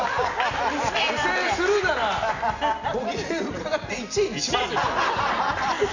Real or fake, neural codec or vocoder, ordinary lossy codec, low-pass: real; none; none; 7.2 kHz